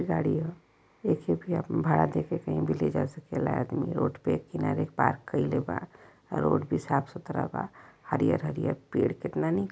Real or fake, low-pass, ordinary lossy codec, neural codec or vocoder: real; none; none; none